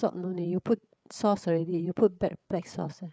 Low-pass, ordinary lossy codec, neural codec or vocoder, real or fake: none; none; codec, 16 kHz, 8 kbps, FreqCodec, larger model; fake